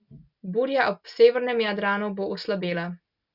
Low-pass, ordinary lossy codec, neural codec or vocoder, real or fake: 5.4 kHz; Opus, 64 kbps; none; real